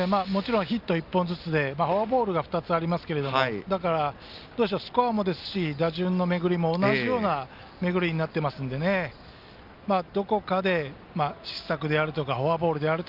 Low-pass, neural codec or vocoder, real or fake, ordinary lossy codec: 5.4 kHz; none; real; Opus, 24 kbps